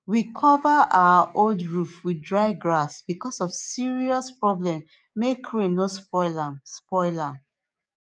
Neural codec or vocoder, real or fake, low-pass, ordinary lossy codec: codec, 44.1 kHz, 7.8 kbps, DAC; fake; 9.9 kHz; none